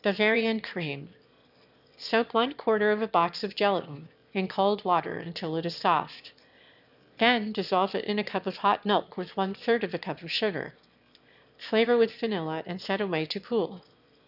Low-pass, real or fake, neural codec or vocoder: 5.4 kHz; fake; autoencoder, 22.05 kHz, a latent of 192 numbers a frame, VITS, trained on one speaker